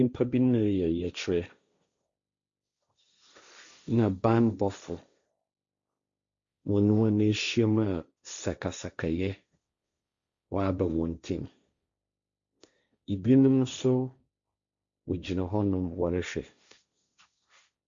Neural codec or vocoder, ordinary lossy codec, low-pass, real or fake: codec, 16 kHz, 1.1 kbps, Voila-Tokenizer; Opus, 64 kbps; 7.2 kHz; fake